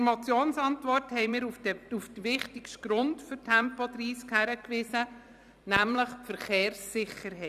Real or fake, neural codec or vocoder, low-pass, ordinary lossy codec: fake; vocoder, 44.1 kHz, 128 mel bands every 256 samples, BigVGAN v2; 14.4 kHz; none